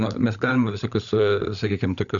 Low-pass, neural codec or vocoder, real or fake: 7.2 kHz; codec, 16 kHz, 16 kbps, FunCodec, trained on LibriTTS, 50 frames a second; fake